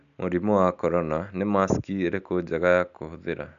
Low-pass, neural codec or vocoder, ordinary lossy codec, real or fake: 7.2 kHz; none; none; real